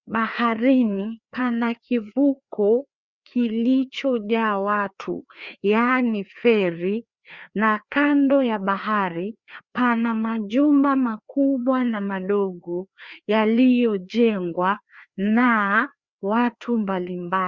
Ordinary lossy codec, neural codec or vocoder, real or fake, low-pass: Opus, 64 kbps; codec, 16 kHz, 2 kbps, FreqCodec, larger model; fake; 7.2 kHz